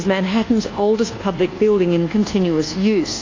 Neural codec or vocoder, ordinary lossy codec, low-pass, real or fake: codec, 24 kHz, 1.2 kbps, DualCodec; AAC, 32 kbps; 7.2 kHz; fake